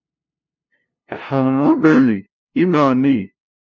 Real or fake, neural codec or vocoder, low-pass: fake; codec, 16 kHz, 0.5 kbps, FunCodec, trained on LibriTTS, 25 frames a second; 7.2 kHz